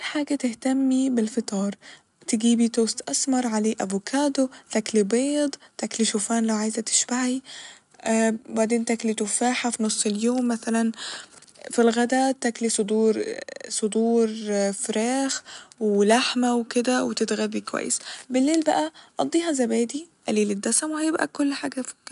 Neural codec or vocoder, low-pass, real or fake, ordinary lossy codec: none; 10.8 kHz; real; none